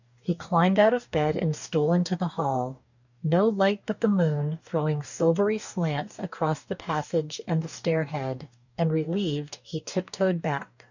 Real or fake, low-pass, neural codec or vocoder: fake; 7.2 kHz; codec, 44.1 kHz, 2.6 kbps, DAC